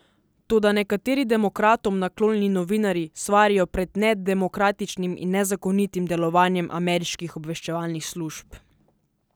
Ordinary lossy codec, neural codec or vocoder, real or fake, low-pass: none; none; real; none